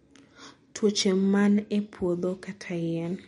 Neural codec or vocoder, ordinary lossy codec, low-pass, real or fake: none; MP3, 48 kbps; 10.8 kHz; real